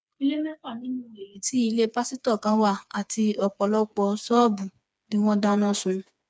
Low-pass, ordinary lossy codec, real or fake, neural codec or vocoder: none; none; fake; codec, 16 kHz, 4 kbps, FreqCodec, smaller model